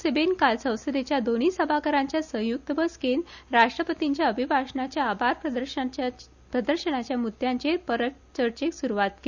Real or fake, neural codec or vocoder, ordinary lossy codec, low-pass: real; none; none; 7.2 kHz